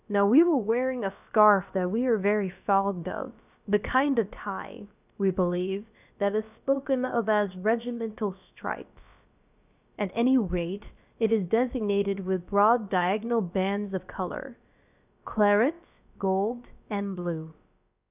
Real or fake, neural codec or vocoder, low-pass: fake; codec, 16 kHz, about 1 kbps, DyCAST, with the encoder's durations; 3.6 kHz